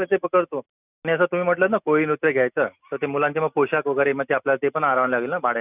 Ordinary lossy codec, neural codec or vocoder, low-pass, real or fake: none; none; 3.6 kHz; real